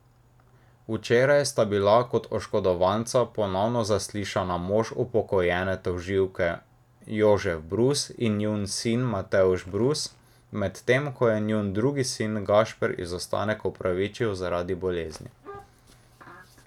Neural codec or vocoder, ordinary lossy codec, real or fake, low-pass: none; none; real; 19.8 kHz